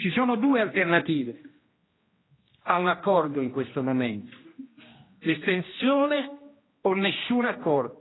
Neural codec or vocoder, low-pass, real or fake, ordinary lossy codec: codec, 16 kHz, 1 kbps, X-Codec, HuBERT features, trained on general audio; 7.2 kHz; fake; AAC, 16 kbps